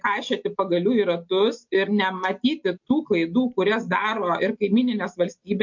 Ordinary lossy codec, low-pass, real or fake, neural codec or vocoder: MP3, 48 kbps; 7.2 kHz; real; none